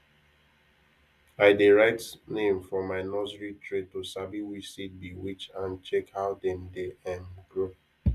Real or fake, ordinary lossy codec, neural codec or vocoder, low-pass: real; Opus, 64 kbps; none; 14.4 kHz